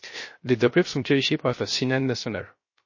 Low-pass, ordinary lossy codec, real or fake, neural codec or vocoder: 7.2 kHz; MP3, 32 kbps; fake; codec, 16 kHz, 0.3 kbps, FocalCodec